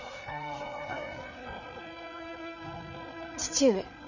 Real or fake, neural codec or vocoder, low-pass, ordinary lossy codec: fake; codec, 16 kHz, 8 kbps, FreqCodec, larger model; 7.2 kHz; none